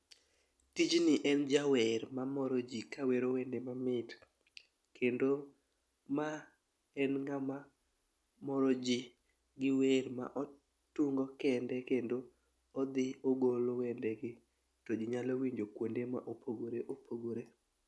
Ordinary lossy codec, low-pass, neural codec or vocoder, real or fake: none; none; none; real